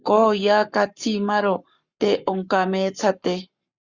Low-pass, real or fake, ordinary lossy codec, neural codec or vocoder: 7.2 kHz; fake; Opus, 64 kbps; codec, 44.1 kHz, 7.8 kbps, Pupu-Codec